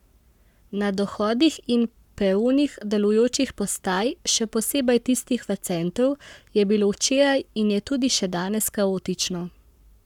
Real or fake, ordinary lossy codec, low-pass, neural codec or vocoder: fake; none; 19.8 kHz; codec, 44.1 kHz, 7.8 kbps, Pupu-Codec